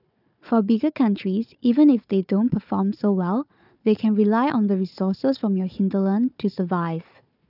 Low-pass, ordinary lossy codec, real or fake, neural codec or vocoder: 5.4 kHz; none; fake; codec, 16 kHz, 4 kbps, FunCodec, trained on Chinese and English, 50 frames a second